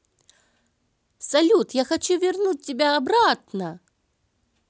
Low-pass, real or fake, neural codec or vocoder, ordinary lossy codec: none; real; none; none